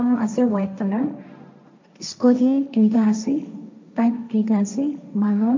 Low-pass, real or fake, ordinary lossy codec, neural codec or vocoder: 7.2 kHz; fake; MP3, 64 kbps; codec, 16 kHz, 1.1 kbps, Voila-Tokenizer